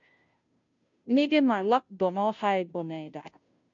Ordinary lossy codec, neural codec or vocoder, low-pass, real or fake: MP3, 48 kbps; codec, 16 kHz, 0.5 kbps, FunCodec, trained on Chinese and English, 25 frames a second; 7.2 kHz; fake